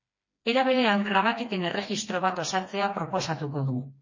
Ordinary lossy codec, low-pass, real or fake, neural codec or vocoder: MP3, 32 kbps; 7.2 kHz; fake; codec, 16 kHz, 2 kbps, FreqCodec, smaller model